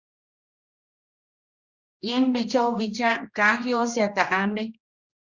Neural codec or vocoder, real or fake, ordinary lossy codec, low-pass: codec, 16 kHz, 1 kbps, X-Codec, HuBERT features, trained on general audio; fake; Opus, 64 kbps; 7.2 kHz